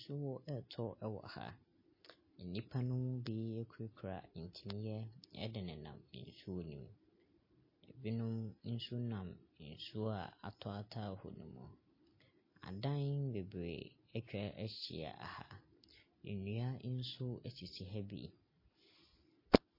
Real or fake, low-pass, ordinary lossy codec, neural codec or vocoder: real; 5.4 kHz; MP3, 24 kbps; none